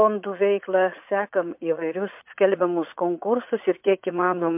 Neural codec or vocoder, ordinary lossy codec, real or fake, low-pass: vocoder, 44.1 kHz, 80 mel bands, Vocos; MP3, 32 kbps; fake; 3.6 kHz